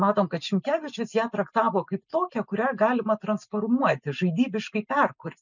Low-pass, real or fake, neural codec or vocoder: 7.2 kHz; real; none